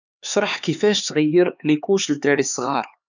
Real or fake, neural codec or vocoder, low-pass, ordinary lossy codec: fake; codec, 16 kHz, 4 kbps, X-Codec, HuBERT features, trained on LibriSpeech; 7.2 kHz; none